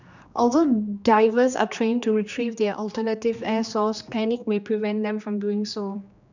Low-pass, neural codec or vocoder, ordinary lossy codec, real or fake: 7.2 kHz; codec, 16 kHz, 2 kbps, X-Codec, HuBERT features, trained on general audio; none; fake